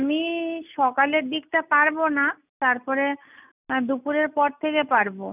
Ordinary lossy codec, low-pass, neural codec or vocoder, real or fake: none; 3.6 kHz; none; real